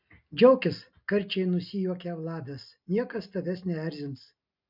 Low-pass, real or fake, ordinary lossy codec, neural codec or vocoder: 5.4 kHz; real; MP3, 48 kbps; none